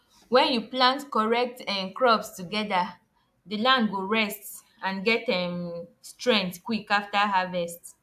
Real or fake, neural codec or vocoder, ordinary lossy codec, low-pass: real; none; AAC, 96 kbps; 14.4 kHz